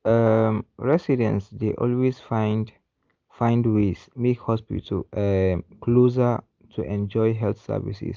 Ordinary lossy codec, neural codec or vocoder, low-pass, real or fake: Opus, 24 kbps; none; 7.2 kHz; real